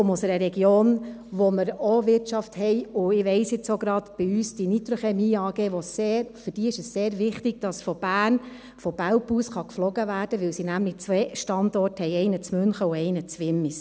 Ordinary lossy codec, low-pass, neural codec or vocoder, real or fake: none; none; none; real